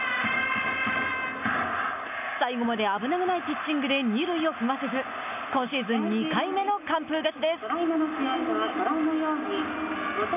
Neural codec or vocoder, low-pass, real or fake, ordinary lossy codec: none; 3.6 kHz; real; none